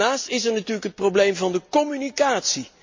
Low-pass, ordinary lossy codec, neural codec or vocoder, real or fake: 7.2 kHz; MP3, 48 kbps; none; real